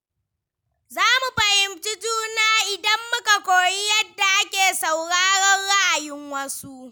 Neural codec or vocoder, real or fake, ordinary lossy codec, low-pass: none; real; none; none